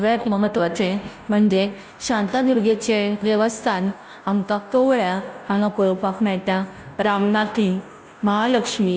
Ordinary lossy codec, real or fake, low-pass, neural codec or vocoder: none; fake; none; codec, 16 kHz, 0.5 kbps, FunCodec, trained on Chinese and English, 25 frames a second